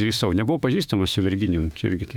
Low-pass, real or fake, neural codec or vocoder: 19.8 kHz; fake; autoencoder, 48 kHz, 32 numbers a frame, DAC-VAE, trained on Japanese speech